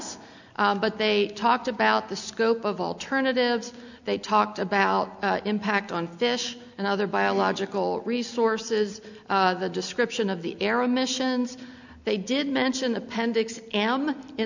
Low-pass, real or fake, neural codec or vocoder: 7.2 kHz; real; none